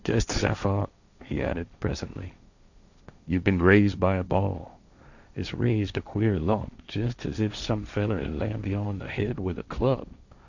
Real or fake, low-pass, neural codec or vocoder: fake; 7.2 kHz; codec, 16 kHz, 1.1 kbps, Voila-Tokenizer